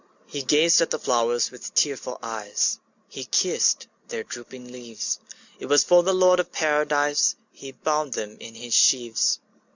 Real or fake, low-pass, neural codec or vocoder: real; 7.2 kHz; none